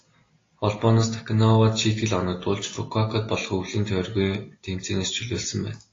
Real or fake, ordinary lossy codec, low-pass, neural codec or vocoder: real; AAC, 32 kbps; 7.2 kHz; none